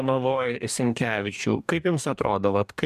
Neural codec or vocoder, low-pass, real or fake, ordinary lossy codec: codec, 44.1 kHz, 2.6 kbps, DAC; 14.4 kHz; fake; MP3, 96 kbps